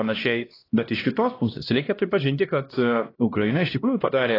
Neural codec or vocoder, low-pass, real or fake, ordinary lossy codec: codec, 16 kHz, 1 kbps, X-Codec, HuBERT features, trained on LibriSpeech; 5.4 kHz; fake; AAC, 24 kbps